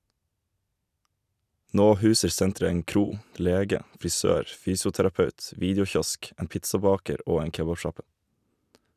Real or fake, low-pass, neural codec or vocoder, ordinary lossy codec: real; 14.4 kHz; none; none